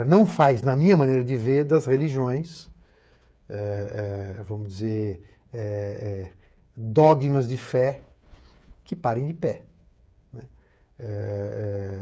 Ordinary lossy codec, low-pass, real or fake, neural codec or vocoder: none; none; fake; codec, 16 kHz, 8 kbps, FreqCodec, smaller model